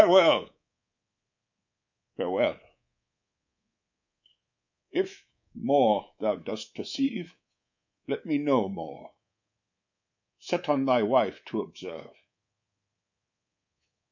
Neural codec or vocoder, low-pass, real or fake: codec, 24 kHz, 3.1 kbps, DualCodec; 7.2 kHz; fake